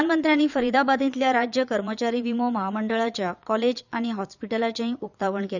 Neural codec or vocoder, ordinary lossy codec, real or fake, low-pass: vocoder, 22.05 kHz, 80 mel bands, Vocos; none; fake; 7.2 kHz